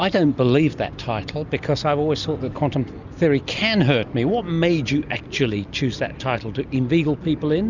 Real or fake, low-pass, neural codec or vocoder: real; 7.2 kHz; none